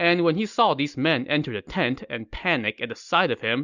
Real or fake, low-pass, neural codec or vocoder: real; 7.2 kHz; none